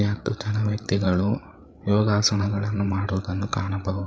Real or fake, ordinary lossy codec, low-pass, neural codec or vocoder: fake; none; none; codec, 16 kHz, 8 kbps, FreqCodec, larger model